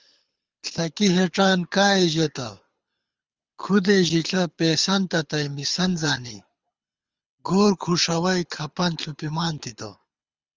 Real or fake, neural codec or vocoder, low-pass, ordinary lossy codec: fake; codec, 24 kHz, 6 kbps, HILCodec; 7.2 kHz; Opus, 32 kbps